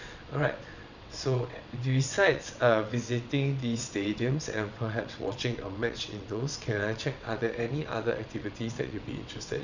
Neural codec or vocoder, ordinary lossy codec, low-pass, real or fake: vocoder, 22.05 kHz, 80 mel bands, WaveNeXt; none; 7.2 kHz; fake